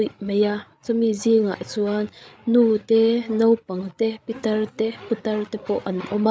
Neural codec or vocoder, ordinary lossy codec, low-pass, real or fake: codec, 16 kHz, 8 kbps, FreqCodec, larger model; none; none; fake